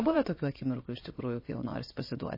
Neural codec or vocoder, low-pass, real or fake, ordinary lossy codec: vocoder, 22.05 kHz, 80 mel bands, WaveNeXt; 5.4 kHz; fake; MP3, 24 kbps